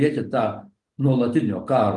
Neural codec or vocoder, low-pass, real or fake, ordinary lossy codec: none; 10.8 kHz; real; Opus, 32 kbps